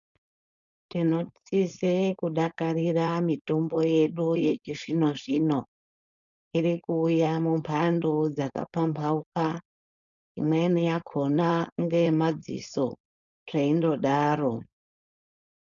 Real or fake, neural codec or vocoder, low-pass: fake; codec, 16 kHz, 4.8 kbps, FACodec; 7.2 kHz